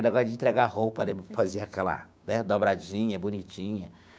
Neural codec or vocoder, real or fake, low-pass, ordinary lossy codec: codec, 16 kHz, 6 kbps, DAC; fake; none; none